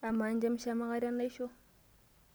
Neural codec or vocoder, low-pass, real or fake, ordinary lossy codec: none; none; real; none